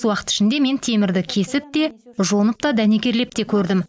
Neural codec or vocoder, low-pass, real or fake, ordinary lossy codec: none; none; real; none